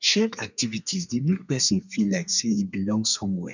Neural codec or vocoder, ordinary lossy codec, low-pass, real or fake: codec, 32 kHz, 1.9 kbps, SNAC; none; 7.2 kHz; fake